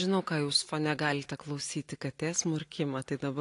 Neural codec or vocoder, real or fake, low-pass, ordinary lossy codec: none; real; 10.8 kHz; AAC, 48 kbps